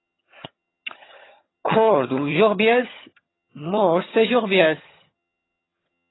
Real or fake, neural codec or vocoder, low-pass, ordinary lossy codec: fake; vocoder, 22.05 kHz, 80 mel bands, HiFi-GAN; 7.2 kHz; AAC, 16 kbps